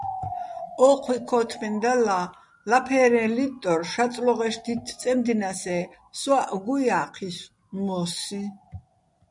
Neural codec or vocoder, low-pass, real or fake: none; 10.8 kHz; real